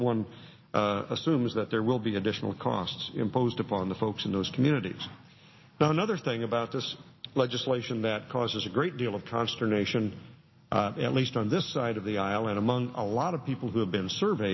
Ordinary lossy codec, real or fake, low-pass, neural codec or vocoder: MP3, 24 kbps; real; 7.2 kHz; none